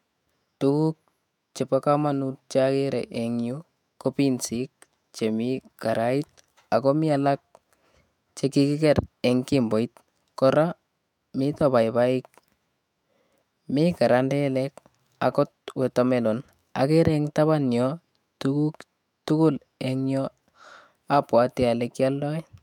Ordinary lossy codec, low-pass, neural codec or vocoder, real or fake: MP3, 96 kbps; 19.8 kHz; autoencoder, 48 kHz, 128 numbers a frame, DAC-VAE, trained on Japanese speech; fake